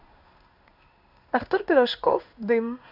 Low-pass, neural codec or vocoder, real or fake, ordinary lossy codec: 5.4 kHz; codec, 16 kHz in and 24 kHz out, 1 kbps, XY-Tokenizer; fake; Opus, 64 kbps